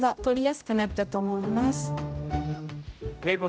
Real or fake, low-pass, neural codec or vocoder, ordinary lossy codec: fake; none; codec, 16 kHz, 0.5 kbps, X-Codec, HuBERT features, trained on general audio; none